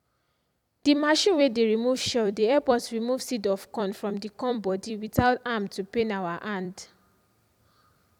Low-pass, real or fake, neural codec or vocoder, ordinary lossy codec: 19.8 kHz; fake; vocoder, 44.1 kHz, 128 mel bands every 256 samples, BigVGAN v2; none